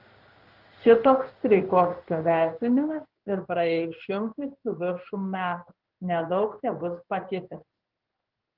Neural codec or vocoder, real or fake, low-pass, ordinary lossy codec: codec, 16 kHz in and 24 kHz out, 1 kbps, XY-Tokenizer; fake; 5.4 kHz; Opus, 24 kbps